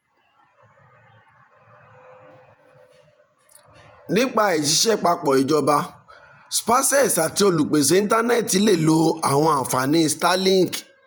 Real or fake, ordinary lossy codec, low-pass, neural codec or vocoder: fake; none; none; vocoder, 48 kHz, 128 mel bands, Vocos